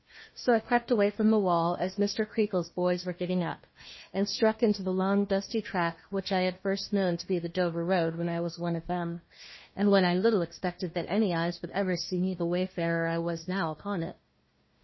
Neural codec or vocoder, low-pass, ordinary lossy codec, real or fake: codec, 16 kHz, 1 kbps, FunCodec, trained on Chinese and English, 50 frames a second; 7.2 kHz; MP3, 24 kbps; fake